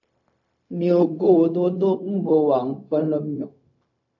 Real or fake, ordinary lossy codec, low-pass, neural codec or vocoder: fake; AAC, 48 kbps; 7.2 kHz; codec, 16 kHz, 0.4 kbps, LongCat-Audio-Codec